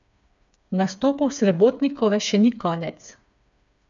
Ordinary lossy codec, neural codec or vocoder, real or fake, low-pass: none; codec, 16 kHz, 4 kbps, FreqCodec, smaller model; fake; 7.2 kHz